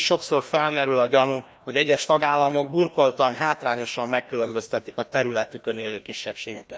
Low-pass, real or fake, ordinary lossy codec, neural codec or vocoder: none; fake; none; codec, 16 kHz, 1 kbps, FreqCodec, larger model